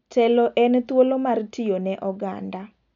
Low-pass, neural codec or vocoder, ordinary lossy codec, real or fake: 7.2 kHz; none; none; real